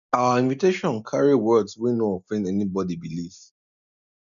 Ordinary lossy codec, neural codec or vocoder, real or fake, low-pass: none; none; real; 7.2 kHz